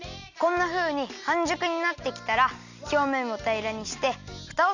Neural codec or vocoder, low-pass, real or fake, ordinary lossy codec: none; 7.2 kHz; real; none